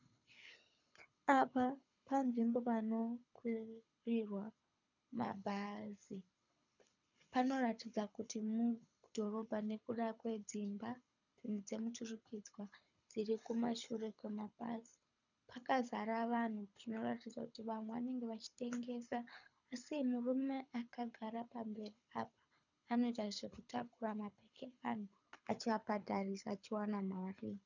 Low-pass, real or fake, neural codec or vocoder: 7.2 kHz; fake; codec, 24 kHz, 6 kbps, HILCodec